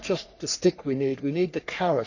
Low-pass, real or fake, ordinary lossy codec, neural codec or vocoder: 7.2 kHz; fake; none; codec, 44.1 kHz, 3.4 kbps, Pupu-Codec